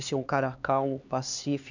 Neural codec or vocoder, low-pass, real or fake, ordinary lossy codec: codec, 16 kHz, 2 kbps, X-Codec, HuBERT features, trained on LibriSpeech; 7.2 kHz; fake; none